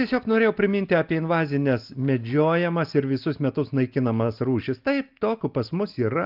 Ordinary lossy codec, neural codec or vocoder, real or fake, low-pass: Opus, 32 kbps; none; real; 5.4 kHz